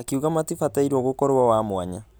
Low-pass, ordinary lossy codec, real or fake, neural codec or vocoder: none; none; real; none